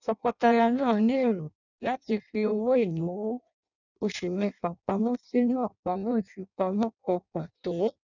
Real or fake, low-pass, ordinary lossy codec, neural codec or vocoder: fake; 7.2 kHz; none; codec, 16 kHz in and 24 kHz out, 0.6 kbps, FireRedTTS-2 codec